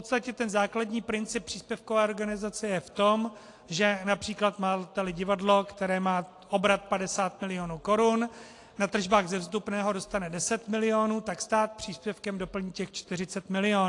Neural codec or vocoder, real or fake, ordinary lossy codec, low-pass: none; real; AAC, 48 kbps; 10.8 kHz